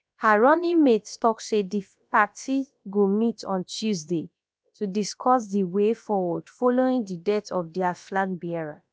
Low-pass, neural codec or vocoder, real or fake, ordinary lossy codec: none; codec, 16 kHz, about 1 kbps, DyCAST, with the encoder's durations; fake; none